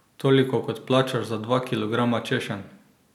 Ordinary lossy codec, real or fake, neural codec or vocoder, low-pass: none; real; none; 19.8 kHz